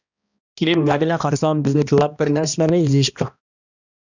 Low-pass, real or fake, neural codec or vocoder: 7.2 kHz; fake; codec, 16 kHz, 1 kbps, X-Codec, HuBERT features, trained on balanced general audio